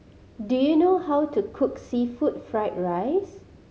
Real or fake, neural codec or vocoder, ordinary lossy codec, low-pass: real; none; none; none